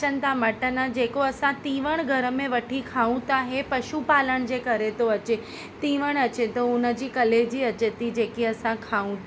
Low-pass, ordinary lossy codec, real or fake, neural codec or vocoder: none; none; real; none